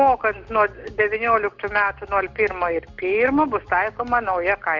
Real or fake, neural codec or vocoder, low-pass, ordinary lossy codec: real; none; 7.2 kHz; MP3, 64 kbps